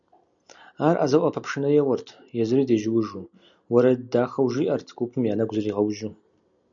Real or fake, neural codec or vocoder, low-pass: real; none; 7.2 kHz